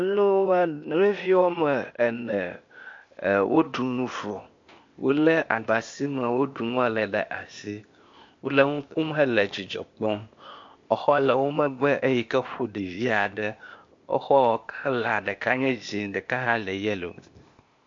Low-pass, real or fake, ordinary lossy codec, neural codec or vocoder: 7.2 kHz; fake; MP3, 48 kbps; codec, 16 kHz, 0.8 kbps, ZipCodec